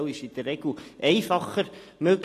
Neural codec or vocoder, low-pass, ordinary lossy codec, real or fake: none; 14.4 kHz; AAC, 48 kbps; real